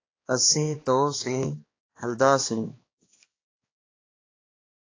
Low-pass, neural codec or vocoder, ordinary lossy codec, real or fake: 7.2 kHz; codec, 16 kHz, 2 kbps, X-Codec, HuBERT features, trained on balanced general audio; AAC, 32 kbps; fake